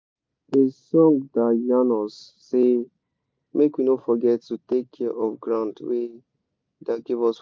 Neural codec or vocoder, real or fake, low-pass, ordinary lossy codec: none; real; none; none